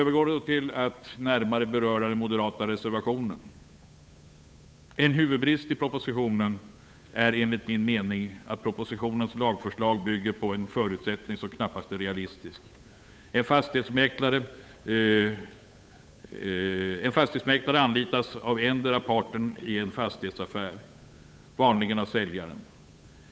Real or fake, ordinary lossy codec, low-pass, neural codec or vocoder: fake; none; none; codec, 16 kHz, 8 kbps, FunCodec, trained on Chinese and English, 25 frames a second